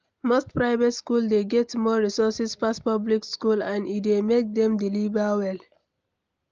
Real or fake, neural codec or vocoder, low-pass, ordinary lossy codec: real; none; 7.2 kHz; Opus, 24 kbps